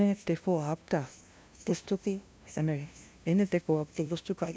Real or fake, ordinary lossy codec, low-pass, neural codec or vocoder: fake; none; none; codec, 16 kHz, 0.5 kbps, FunCodec, trained on LibriTTS, 25 frames a second